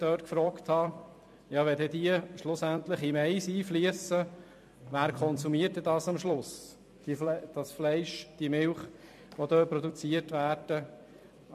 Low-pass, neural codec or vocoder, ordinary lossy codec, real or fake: 14.4 kHz; vocoder, 44.1 kHz, 128 mel bands every 256 samples, BigVGAN v2; MP3, 64 kbps; fake